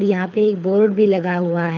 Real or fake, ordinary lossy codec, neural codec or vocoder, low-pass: fake; none; codec, 24 kHz, 6 kbps, HILCodec; 7.2 kHz